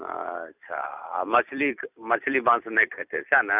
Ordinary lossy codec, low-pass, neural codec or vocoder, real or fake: none; 3.6 kHz; vocoder, 44.1 kHz, 128 mel bands every 256 samples, BigVGAN v2; fake